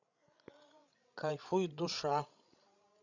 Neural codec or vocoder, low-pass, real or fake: codec, 16 kHz, 16 kbps, FreqCodec, larger model; 7.2 kHz; fake